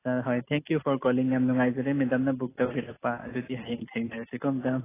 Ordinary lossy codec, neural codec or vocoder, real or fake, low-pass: AAC, 16 kbps; none; real; 3.6 kHz